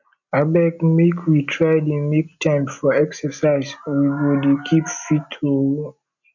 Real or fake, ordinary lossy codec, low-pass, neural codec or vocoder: real; none; 7.2 kHz; none